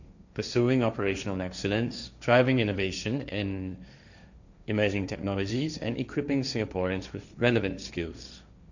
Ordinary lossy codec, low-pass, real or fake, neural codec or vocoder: none; 7.2 kHz; fake; codec, 16 kHz, 1.1 kbps, Voila-Tokenizer